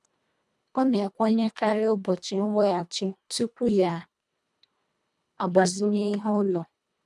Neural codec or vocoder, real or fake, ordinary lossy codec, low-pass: codec, 24 kHz, 1.5 kbps, HILCodec; fake; none; none